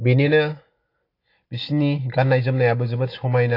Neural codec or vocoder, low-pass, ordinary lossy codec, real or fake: none; 5.4 kHz; AAC, 32 kbps; real